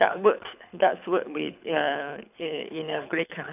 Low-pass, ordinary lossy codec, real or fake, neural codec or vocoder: 3.6 kHz; none; fake; codec, 24 kHz, 6 kbps, HILCodec